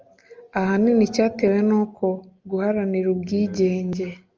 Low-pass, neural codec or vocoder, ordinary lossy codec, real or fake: 7.2 kHz; none; Opus, 24 kbps; real